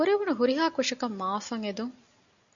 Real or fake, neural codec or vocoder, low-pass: real; none; 7.2 kHz